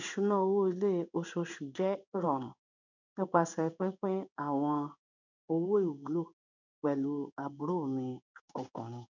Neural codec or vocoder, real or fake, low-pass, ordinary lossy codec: codec, 16 kHz in and 24 kHz out, 1 kbps, XY-Tokenizer; fake; 7.2 kHz; none